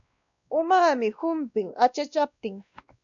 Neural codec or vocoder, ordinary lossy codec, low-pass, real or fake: codec, 16 kHz, 1 kbps, X-Codec, WavLM features, trained on Multilingual LibriSpeech; MP3, 96 kbps; 7.2 kHz; fake